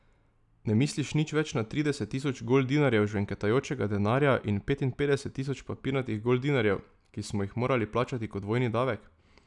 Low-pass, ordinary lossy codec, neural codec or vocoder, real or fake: 10.8 kHz; none; none; real